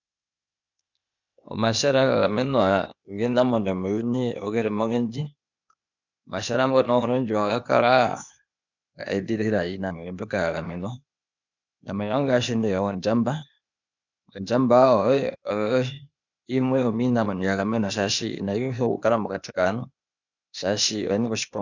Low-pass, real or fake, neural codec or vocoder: 7.2 kHz; fake; codec, 16 kHz, 0.8 kbps, ZipCodec